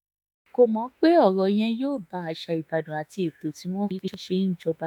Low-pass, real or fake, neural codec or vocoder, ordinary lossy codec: 19.8 kHz; fake; autoencoder, 48 kHz, 32 numbers a frame, DAC-VAE, trained on Japanese speech; none